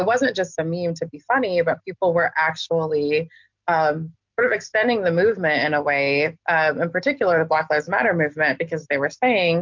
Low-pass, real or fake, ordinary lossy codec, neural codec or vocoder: 7.2 kHz; real; MP3, 64 kbps; none